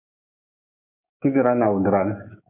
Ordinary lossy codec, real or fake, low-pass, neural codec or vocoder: MP3, 32 kbps; fake; 3.6 kHz; codec, 16 kHz, 6 kbps, DAC